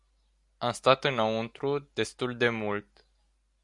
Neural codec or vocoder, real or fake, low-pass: none; real; 10.8 kHz